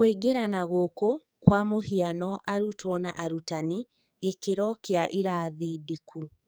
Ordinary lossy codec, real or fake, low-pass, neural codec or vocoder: none; fake; none; codec, 44.1 kHz, 2.6 kbps, SNAC